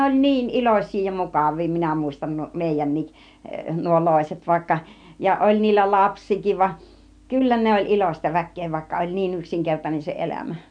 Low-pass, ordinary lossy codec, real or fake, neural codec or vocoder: 9.9 kHz; none; real; none